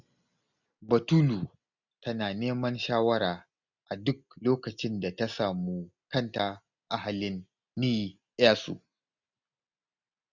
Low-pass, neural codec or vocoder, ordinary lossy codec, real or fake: 7.2 kHz; none; none; real